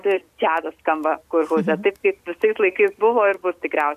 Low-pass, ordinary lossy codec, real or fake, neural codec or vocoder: 14.4 kHz; MP3, 96 kbps; real; none